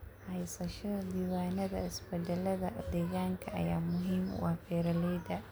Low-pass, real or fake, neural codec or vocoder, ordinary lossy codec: none; real; none; none